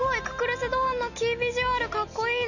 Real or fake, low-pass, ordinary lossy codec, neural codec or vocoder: real; 7.2 kHz; none; none